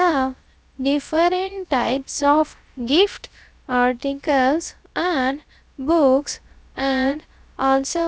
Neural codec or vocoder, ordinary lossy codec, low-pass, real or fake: codec, 16 kHz, about 1 kbps, DyCAST, with the encoder's durations; none; none; fake